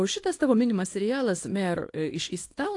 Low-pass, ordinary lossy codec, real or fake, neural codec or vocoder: 10.8 kHz; AAC, 48 kbps; fake; codec, 24 kHz, 0.9 kbps, WavTokenizer, small release